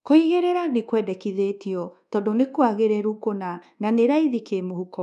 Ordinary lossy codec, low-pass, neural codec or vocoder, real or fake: none; 10.8 kHz; codec, 24 kHz, 1.2 kbps, DualCodec; fake